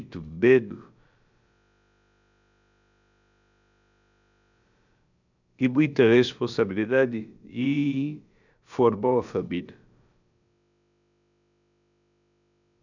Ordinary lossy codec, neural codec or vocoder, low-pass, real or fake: none; codec, 16 kHz, about 1 kbps, DyCAST, with the encoder's durations; 7.2 kHz; fake